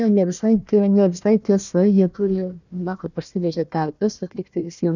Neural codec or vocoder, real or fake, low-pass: codec, 16 kHz, 1 kbps, FunCodec, trained on Chinese and English, 50 frames a second; fake; 7.2 kHz